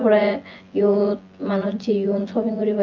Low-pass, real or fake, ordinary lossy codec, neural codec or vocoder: 7.2 kHz; fake; Opus, 24 kbps; vocoder, 24 kHz, 100 mel bands, Vocos